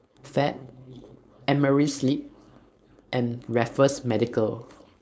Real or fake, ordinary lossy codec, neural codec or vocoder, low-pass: fake; none; codec, 16 kHz, 4.8 kbps, FACodec; none